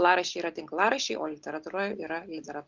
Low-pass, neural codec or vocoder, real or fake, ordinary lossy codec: 7.2 kHz; none; real; Opus, 64 kbps